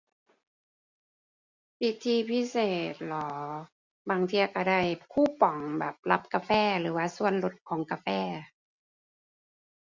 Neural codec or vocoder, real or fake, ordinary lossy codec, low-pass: none; real; Opus, 64 kbps; 7.2 kHz